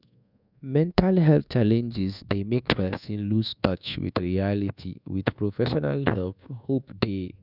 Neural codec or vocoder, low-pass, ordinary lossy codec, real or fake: codec, 24 kHz, 1.2 kbps, DualCodec; 5.4 kHz; none; fake